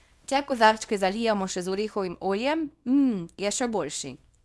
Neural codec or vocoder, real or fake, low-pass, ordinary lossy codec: codec, 24 kHz, 0.9 kbps, WavTokenizer, small release; fake; none; none